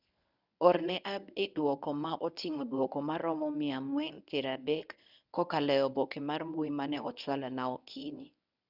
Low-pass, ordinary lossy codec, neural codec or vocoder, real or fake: 5.4 kHz; none; codec, 24 kHz, 0.9 kbps, WavTokenizer, medium speech release version 1; fake